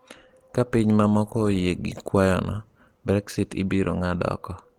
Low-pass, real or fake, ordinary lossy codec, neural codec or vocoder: 19.8 kHz; real; Opus, 16 kbps; none